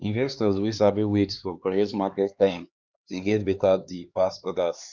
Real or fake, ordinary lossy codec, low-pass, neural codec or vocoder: fake; Opus, 64 kbps; 7.2 kHz; codec, 16 kHz, 2 kbps, X-Codec, HuBERT features, trained on LibriSpeech